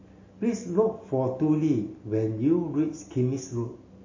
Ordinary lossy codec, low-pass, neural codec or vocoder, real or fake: MP3, 32 kbps; 7.2 kHz; none; real